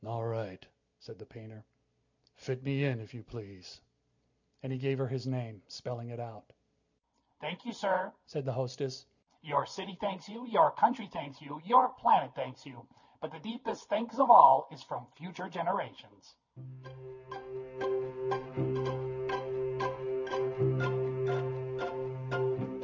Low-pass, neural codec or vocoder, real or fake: 7.2 kHz; none; real